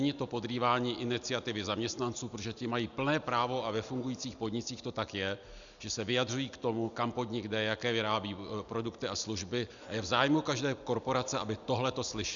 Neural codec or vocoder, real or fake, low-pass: none; real; 7.2 kHz